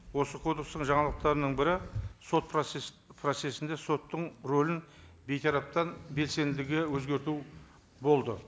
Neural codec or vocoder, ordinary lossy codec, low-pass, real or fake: none; none; none; real